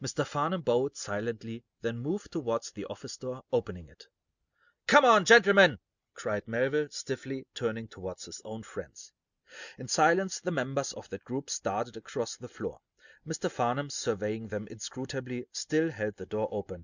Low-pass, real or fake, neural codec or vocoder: 7.2 kHz; real; none